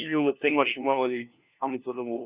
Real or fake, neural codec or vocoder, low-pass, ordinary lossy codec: fake; codec, 16 kHz, 1 kbps, FunCodec, trained on LibriTTS, 50 frames a second; 3.6 kHz; Opus, 64 kbps